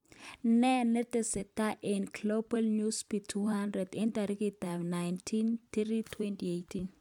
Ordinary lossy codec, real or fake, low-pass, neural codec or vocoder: none; fake; 19.8 kHz; vocoder, 44.1 kHz, 128 mel bands every 256 samples, BigVGAN v2